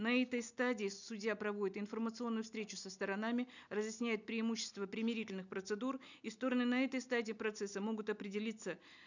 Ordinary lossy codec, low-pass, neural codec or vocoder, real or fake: none; 7.2 kHz; none; real